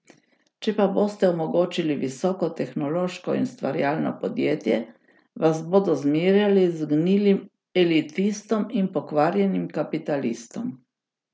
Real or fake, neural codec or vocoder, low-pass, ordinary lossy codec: real; none; none; none